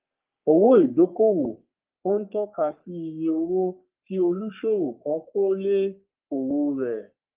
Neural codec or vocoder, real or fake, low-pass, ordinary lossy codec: codec, 44.1 kHz, 3.4 kbps, Pupu-Codec; fake; 3.6 kHz; Opus, 32 kbps